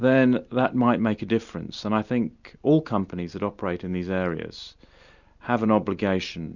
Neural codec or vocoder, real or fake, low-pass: none; real; 7.2 kHz